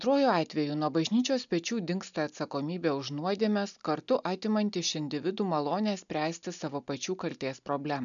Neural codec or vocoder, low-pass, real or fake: none; 7.2 kHz; real